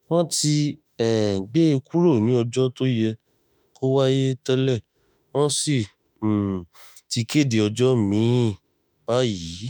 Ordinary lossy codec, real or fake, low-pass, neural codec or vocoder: none; fake; none; autoencoder, 48 kHz, 32 numbers a frame, DAC-VAE, trained on Japanese speech